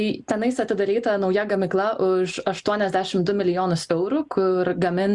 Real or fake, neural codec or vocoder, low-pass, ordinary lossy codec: real; none; 10.8 kHz; Opus, 64 kbps